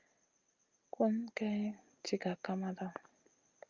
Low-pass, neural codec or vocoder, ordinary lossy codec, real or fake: 7.2 kHz; none; Opus, 24 kbps; real